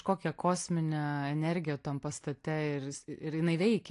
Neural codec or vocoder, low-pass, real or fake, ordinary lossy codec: none; 10.8 kHz; real; AAC, 48 kbps